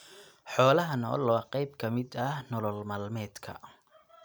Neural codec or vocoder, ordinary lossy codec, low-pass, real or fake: none; none; none; real